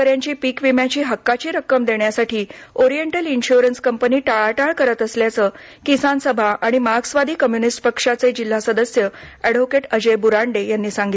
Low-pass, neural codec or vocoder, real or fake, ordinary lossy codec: none; none; real; none